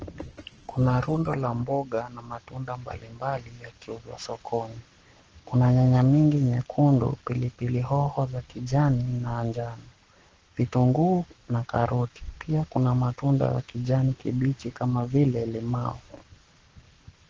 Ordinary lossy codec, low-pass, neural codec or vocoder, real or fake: Opus, 16 kbps; 7.2 kHz; codec, 44.1 kHz, 7.8 kbps, Pupu-Codec; fake